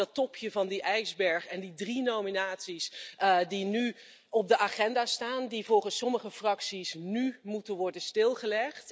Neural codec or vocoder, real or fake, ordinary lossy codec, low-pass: none; real; none; none